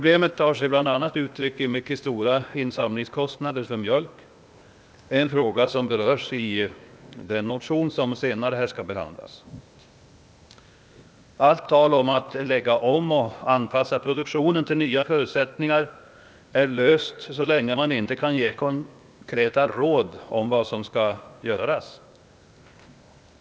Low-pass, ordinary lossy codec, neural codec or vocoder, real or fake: none; none; codec, 16 kHz, 0.8 kbps, ZipCodec; fake